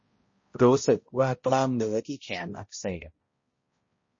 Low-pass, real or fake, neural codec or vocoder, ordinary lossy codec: 7.2 kHz; fake; codec, 16 kHz, 0.5 kbps, X-Codec, HuBERT features, trained on balanced general audio; MP3, 32 kbps